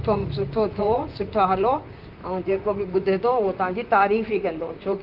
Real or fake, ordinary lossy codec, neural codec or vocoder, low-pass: fake; Opus, 32 kbps; vocoder, 44.1 kHz, 128 mel bands, Pupu-Vocoder; 5.4 kHz